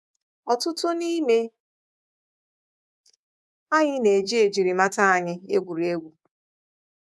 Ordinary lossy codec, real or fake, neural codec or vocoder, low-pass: none; fake; codec, 24 kHz, 3.1 kbps, DualCodec; none